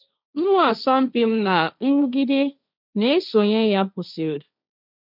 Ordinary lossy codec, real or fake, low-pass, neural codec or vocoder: none; fake; 5.4 kHz; codec, 16 kHz, 1.1 kbps, Voila-Tokenizer